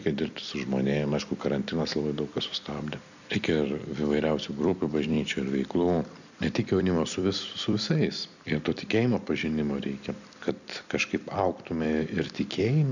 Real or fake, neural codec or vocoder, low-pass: fake; vocoder, 44.1 kHz, 128 mel bands every 256 samples, BigVGAN v2; 7.2 kHz